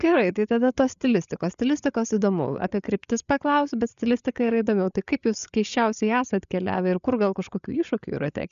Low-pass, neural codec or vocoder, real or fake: 7.2 kHz; codec, 16 kHz, 8 kbps, FreqCodec, larger model; fake